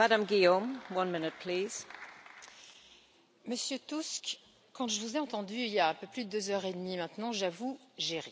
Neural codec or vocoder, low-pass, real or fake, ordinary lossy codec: none; none; real; none